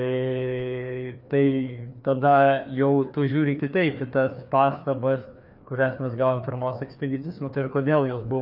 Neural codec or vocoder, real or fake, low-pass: codec, 16 kHz, 2 kbps, FreqCodec, larger model; fake; 5.4 kHz